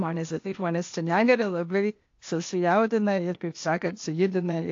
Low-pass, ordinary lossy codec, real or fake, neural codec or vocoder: 7.2 kHz; AAC, 48 kbps; fake; codec, 16 kHz, 0.8 kbps, ZipCodec